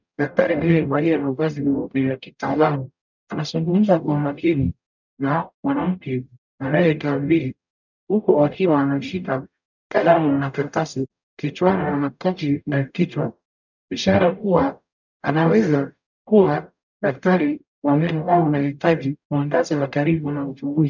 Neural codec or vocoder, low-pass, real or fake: codec, 44.1 kHz, 0.9 kbps, DAC; 7.2 kHz; fake